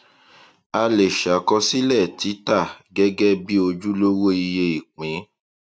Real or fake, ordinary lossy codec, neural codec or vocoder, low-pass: real; none; none; none